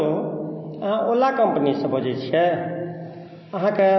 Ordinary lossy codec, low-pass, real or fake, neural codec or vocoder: MP3, 24 kbps; 7.2 kHz; real; none